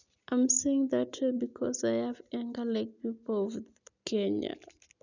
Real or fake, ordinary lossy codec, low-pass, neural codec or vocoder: real; none; 7.2 kHz; none